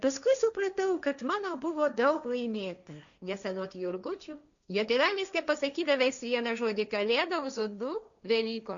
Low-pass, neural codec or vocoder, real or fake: 7.2 kHz; codec, 16 kHz, 1.1 kbps, Voila-Tokenizer; fake